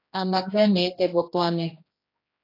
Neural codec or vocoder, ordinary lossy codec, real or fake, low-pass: codec, 16 kHz, 1 kbps, X-Codec, HuBERT features, trained on general audio; AAC, 32 kbps; fake; 5.4 kHz